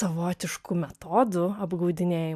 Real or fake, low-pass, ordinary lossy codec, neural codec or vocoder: real; 14.4 kHz; AAC, 96 kbps; none